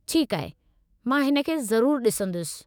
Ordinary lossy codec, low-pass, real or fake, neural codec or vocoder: none; none; fake; autoencoder, 48 kHz, 128 numbers a frame, DAC-VAE, trained on Japanese speech